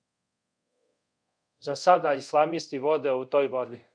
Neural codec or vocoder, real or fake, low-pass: codec, 24 kHz, 0.5 kbps, DualCodec; fake; 9.9 kHz